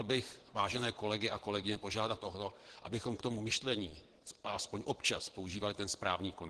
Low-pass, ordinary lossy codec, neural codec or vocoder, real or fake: 9.9 kHz; Opus, 16 kbps; vocoder, 22.05 kHz, 80 mel bands, WaveNeXt; fake